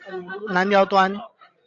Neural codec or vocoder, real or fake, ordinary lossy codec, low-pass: codec, 16 kHz, 16 kbps, FreqCodec, larger model; fake; AAC, 48 kbps; 7.2 kHz